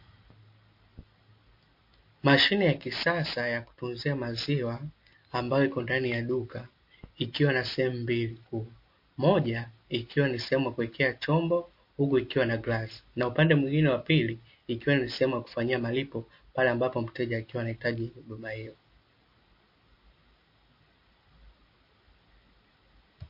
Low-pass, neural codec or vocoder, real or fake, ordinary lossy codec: 5.4 kHz; none; real; MP3, 32 kbps